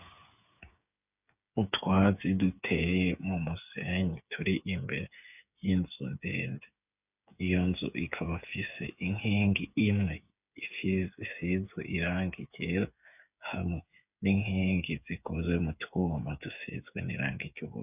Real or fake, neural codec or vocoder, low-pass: fake; codec, 16 kHz, 8 kbps, FreqCodec, smaller model; 3.6 kHz